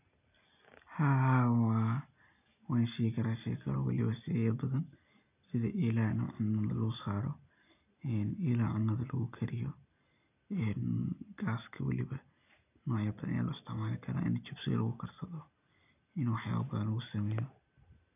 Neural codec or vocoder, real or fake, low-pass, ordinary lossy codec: none; real; 3.6 kHz; none